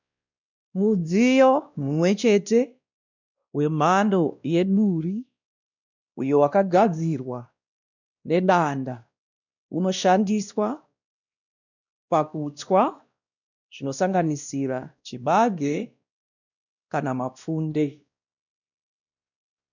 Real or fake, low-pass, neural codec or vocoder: fake; 7.2 kHz; codec, 16 kHz, 1 kbps, X-Codec, WavLM features, trained on Multilingual LibriSpeech